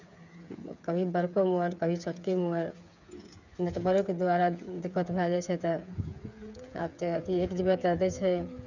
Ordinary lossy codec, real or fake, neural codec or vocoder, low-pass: none; fake; codec, 16 kHz, 8 kbps, FreqCodec, smaller model; 7.2 kHz